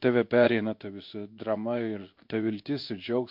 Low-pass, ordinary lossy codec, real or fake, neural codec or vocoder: 5.4 kHz; MP3, 48 kbps; fake; codec, 16 kHz in and 24 kHz out, 1 kbps, XY-Tokenizer